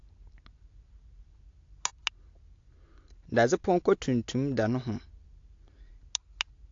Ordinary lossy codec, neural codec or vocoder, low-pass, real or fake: AAC, 48 kbps; none; 7.2 kHz; real